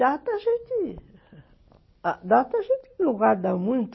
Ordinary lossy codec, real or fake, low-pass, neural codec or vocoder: MP3, 24 kbps; real; 7.2 kHz; none